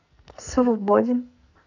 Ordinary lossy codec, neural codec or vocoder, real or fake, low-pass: none; codec, 44.1 kHz, 2.6 kbps, SNAC; fake; 7.2 kHz